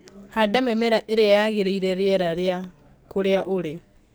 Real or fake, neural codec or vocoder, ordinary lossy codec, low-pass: fake; codec, 44.1 kHz, 2.6 kbps, SNAC; none; none